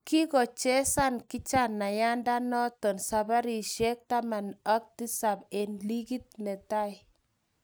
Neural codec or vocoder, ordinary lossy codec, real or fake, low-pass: none; none; real; none